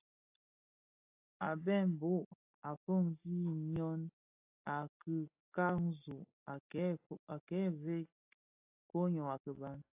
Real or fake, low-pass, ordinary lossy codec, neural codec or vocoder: real; 3.6 kHz; AAC, 32 kbps; none